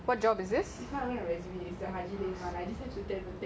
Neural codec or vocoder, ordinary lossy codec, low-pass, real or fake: none; none; none; real